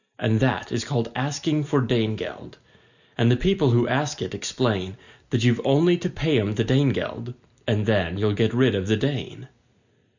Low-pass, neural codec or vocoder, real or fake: 7.2 kHz; none; real